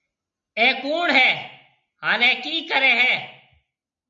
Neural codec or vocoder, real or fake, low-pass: none; real; 7.2 kHz